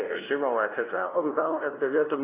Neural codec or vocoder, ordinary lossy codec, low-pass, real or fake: codec, 16 kHz, 0.5 kbps, FunCodec, trained on LibriTTS, 25 frames a second; AAC, 16 kbps; 3.6 kHz; fake